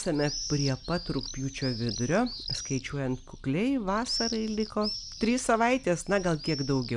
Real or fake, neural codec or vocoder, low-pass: real; none; 10.8 kHz